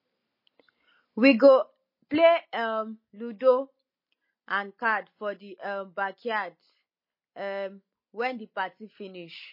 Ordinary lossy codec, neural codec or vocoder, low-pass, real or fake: MP3, 24 kbps; none; 5.4 kHz; real